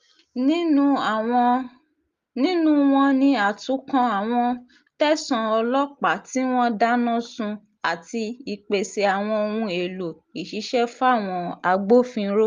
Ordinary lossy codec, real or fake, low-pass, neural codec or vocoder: Opus, 32 kbps; real; 7.2 kHz; none